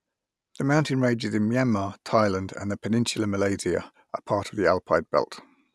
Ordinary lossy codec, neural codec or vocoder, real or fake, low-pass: none; none; real; none